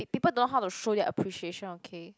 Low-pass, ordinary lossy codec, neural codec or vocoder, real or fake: none; none; none; real